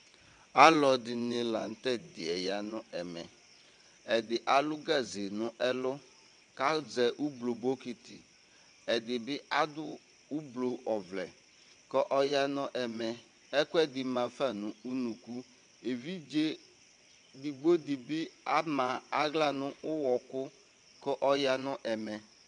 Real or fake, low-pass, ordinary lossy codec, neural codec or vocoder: fake; 9.9 kHz; MP3, 64 kbps; vocoder, 22.05 kHz, 80 mel bands, WaveNeXt